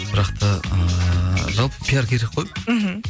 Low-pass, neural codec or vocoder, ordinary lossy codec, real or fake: none; none; none; real